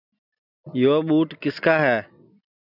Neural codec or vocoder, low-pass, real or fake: none; 5.4 kHz; real